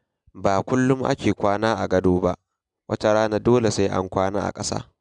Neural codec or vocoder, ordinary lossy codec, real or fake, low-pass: none; none; real; 10.8 kHz